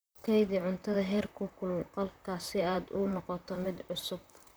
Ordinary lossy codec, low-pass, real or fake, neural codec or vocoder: none; none; fake; vocoder, 44.1 kHz, 128 mel bands, Pupu-Vocoder